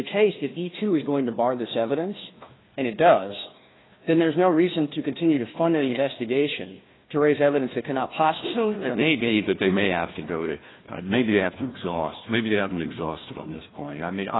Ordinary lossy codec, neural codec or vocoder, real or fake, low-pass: AAC, 16 kbps; codec, 16 kHz, 1 kbps, FunCodec, trained on Chinese and English, 50 frames a second; fake; 7.2 kHz